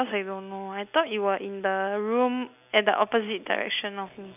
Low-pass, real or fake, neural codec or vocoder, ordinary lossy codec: 3.6 kHz; real; none; none